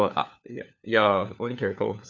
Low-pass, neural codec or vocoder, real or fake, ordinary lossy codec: 7.2 kHz; codec, 16 kHz, 4 kbps, FunCodec, trained on LibriTTS, 50 frames a second; fake; none